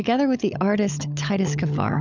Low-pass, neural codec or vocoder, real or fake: 7.2 kHz; codec, 16 kHz, 16 kbps, FunCodec, trained on LibriTTS, 50 frames a second; fake